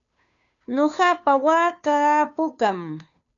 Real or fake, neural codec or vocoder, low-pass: fake; codec, 16 kHz, 2 kbps, FunCodec, trained on Chinese and English, 25 frames a second; 7.2 kHz